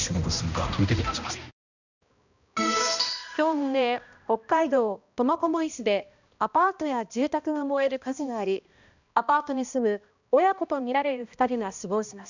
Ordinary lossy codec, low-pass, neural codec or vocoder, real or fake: none; 7.2 kHz; codec, 16 kHz, 1 kbps, X-Codec, HuBERT features, trained on balanced general audio; fake